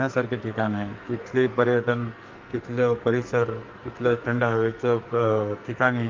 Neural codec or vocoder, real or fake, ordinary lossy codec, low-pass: codec, 44.1 kHz, 2.6 kbps, SNAC; fake; Opus, 32 kbps; 7.2 kHz